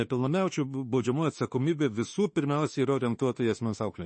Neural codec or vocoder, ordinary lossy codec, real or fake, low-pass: autoencoder, 48 kHz, 32 numbers a frame, DAC-VAE, trained on Japanese speech; MP3, 32 kbps; fake; 10.8 kHz